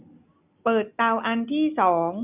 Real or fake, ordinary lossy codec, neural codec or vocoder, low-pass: real; none; none; 3.6 kHz